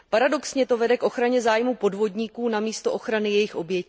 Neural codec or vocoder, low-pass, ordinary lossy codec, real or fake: none; none; none; real